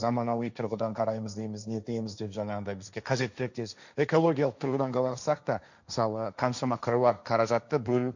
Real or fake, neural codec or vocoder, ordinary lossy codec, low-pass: fake; codec, 16 kHz, 1.1 kbps, Voila-Tokenizer; none; 7.2 kHz